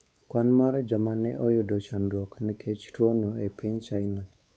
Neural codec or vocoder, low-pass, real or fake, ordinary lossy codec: codec, 16 kHz, 4 kbps, X-Codec, WavLM features, trained on Multilingual LibriSpeech; none; fake; none